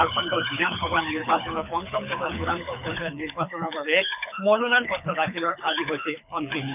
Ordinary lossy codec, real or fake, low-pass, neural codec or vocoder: none; fake; 3.6 kHz; codec, 24 kHz, 6 kbps, HILCodec